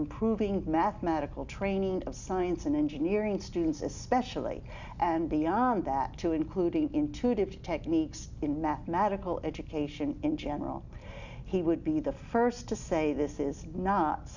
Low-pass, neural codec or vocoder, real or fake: 7.2 kHz; none; real